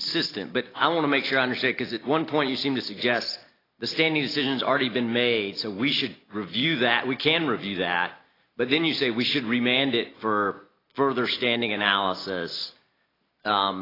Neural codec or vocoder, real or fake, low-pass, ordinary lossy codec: none; real; 5.4 kHz; AAC, 24 kbps